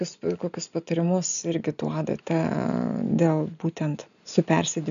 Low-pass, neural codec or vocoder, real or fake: 7.2 kHz; none; real